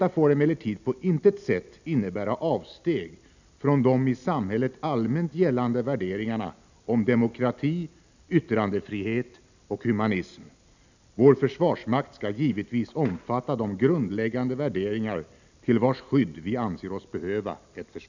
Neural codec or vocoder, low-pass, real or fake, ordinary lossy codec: none; 7.2 kHz; real; none